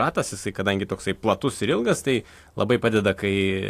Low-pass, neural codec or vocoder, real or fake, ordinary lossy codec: 14.4 kHz; none; real; AAC, 64 kbps